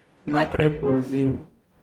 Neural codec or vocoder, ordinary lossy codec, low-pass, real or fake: codec, 44.1 kHz, 0.9 kbps, DAC; Opus, 32 kbps; 19.8 kHz; fake